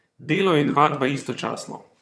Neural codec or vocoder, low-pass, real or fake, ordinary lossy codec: vocoder, 22.05 kHz, 80 mel bands, HiFi-GAN; none; fake; none